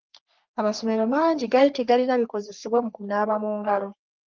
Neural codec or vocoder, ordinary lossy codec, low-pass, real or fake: codec, 44.1 kHz, 3.4 kbps, Pupu-Codec; Opus, 24 kbps; 7.2 kHz; fake